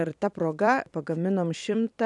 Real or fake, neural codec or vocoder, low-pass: fake; vocoder, 44.1 kHz, 128 mel bands every 256 samples, BigVGAN v2; 10.8 kHz